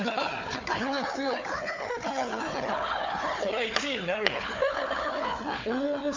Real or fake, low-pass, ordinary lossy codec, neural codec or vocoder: fake; 7.2 kHz; MP3, 64 kbps; codec, 16 kHz, 4 kbps, FunCodec, trained on Chinese and English, 50 frames a second